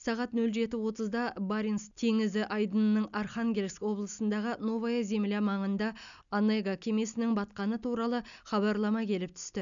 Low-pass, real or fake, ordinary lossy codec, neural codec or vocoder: 7.2 kHz; real; none; none